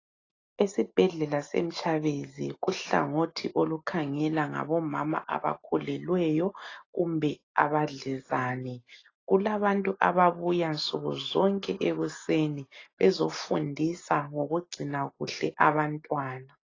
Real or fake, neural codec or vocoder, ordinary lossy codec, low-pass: real; none; AAC, 32 kbps; 7.2 kHz